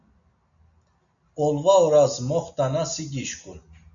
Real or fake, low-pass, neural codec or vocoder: real; 7.2 kHz; none